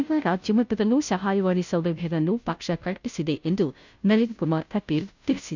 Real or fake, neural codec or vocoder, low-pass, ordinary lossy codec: fake; codec, 16 kHz, 0.5 kbps, FunCodec, trained on Chinese and English, 25 frames a second; 7.2 kHz; none